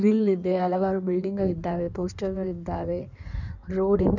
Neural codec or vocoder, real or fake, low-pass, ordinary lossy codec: codec, 16 kHz in and 24 kHz out, 1.1 kbps, FireRedTTS-2 codec; fake; 7.2 kHz; none